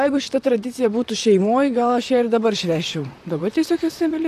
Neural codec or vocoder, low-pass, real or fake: vocoder, 44.1 kHz, 128 mel bands, Pupu-Vocoder; 14.4 kHz; fake